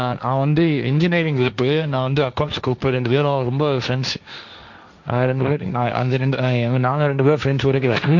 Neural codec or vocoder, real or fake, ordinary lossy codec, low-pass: codec, 16 kHz, 1.1 kbps, Voila-Tokenizer; fake; none; 7.2 kHz